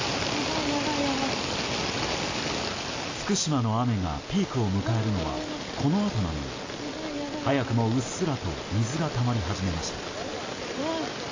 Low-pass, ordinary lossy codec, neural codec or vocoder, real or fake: 7.2 kHz; AAC, 32 kbps; none; real